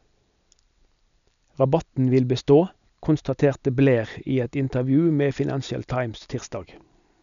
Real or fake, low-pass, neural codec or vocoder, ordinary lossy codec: real; 7.2 kHz; none; none